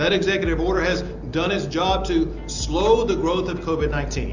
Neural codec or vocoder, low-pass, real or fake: none; 7.2 kHz; real